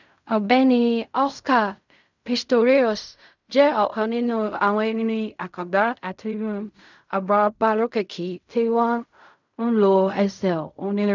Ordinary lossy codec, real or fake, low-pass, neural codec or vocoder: none; fake; 7.2 kHz; codec, 16 kHz in and 24 kHz out, 0.4 kbps, LongCat-Audio-Codec, fine tuned four codebook decoder